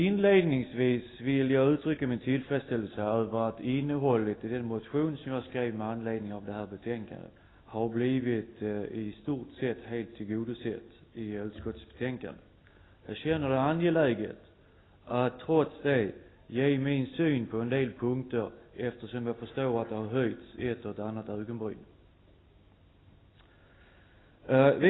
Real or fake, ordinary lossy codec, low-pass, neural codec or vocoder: real; AAC, 16 kbps; 7.2 kHz; none